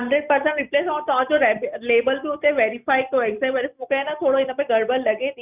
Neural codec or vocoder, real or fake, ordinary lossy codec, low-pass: none; real; Opus, 64 kbps; 3.6 kHz